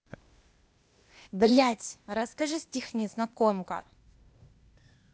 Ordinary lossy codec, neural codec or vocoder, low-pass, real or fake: none; codec, 16 kHz, 0.8 kbps, ZipCodec; none; fake